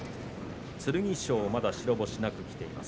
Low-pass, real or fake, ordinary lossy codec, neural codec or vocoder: none; real; none; none